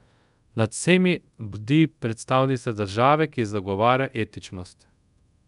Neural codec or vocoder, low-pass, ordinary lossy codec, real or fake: codec, 24 kHz, 0.5 kbps, DualCodec; 10.8 kHz; none; fake